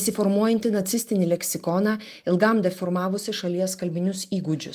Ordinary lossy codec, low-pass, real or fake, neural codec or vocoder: Opus, 32 kbps; 14.4 kHz; real; none